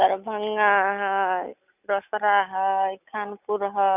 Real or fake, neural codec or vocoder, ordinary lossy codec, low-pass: real; none; none; 3.6 kHz